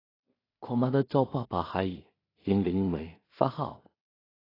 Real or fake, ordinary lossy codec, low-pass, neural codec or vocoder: fake; AAC, 24 kbps; 5.4 kHz; codec, 16 kHz in and 24 kHz out, 0.4 kbps, LongCat-Audio-Codec, two codebook decoder